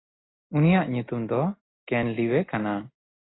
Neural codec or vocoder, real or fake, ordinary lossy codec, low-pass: none; real; AAC, 16 kbps; 7.2 kHz